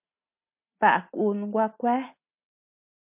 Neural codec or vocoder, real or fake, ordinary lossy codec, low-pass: none; real; MP3, 32 kbps; 3.6 kHz